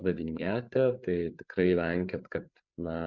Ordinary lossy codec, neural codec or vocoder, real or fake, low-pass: Opus, 64 kbps; codec, 16 kHz, 8 kbps, FreqCodec, larger model; fake; 7.2 kHz